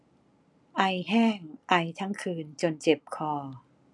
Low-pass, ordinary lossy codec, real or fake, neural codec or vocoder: 10.8 kHz; none; real; none